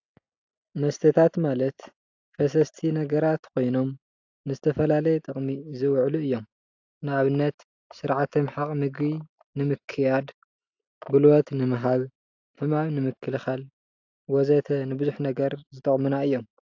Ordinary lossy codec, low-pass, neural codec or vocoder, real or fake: AAC, 48 kbps; 7.2 kHz; none; real